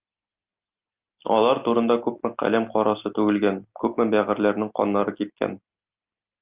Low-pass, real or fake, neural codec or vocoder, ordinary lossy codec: 3.6 kHz; real; none; Opus, 24 kbps